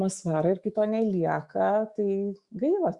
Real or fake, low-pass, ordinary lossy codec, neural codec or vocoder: fake; 10.8 kHz; Opus, 64 kbps; autoencoder, 48 kHz, 128 numbers a frame, DAC-VAE, trained on Japanese speech